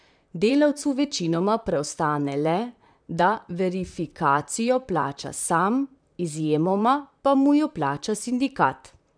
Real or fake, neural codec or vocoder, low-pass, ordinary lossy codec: fake; vocoder, 44.1 kHz, 128 mel bands, Pupu-Vocoder; 9.9 kHz; none